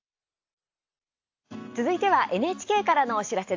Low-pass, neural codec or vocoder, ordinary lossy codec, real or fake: 7.2 kHz; none; AAC, 48 kbps; real